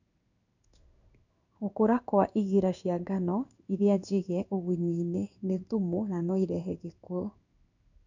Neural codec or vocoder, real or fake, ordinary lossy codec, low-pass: codec, 16 kHz in and 24 kHz out, 1 kbps, XY-Tokenizer; fake; none; 7.2 kHz